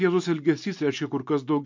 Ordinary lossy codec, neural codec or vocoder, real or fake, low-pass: MP3, 48 kbps; none; real; 7.2 kHz